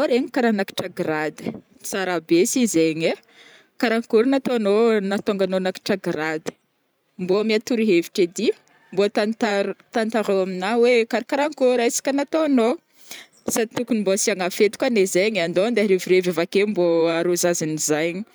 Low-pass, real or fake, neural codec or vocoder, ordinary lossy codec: none; fake; vocoder, 44.1 kHz, 128 mel bands every 512 samples, BigVGAN v2; none